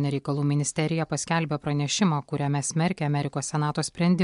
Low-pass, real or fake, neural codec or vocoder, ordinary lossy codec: 10.8 kHz; real; none; MP3, 64 kbps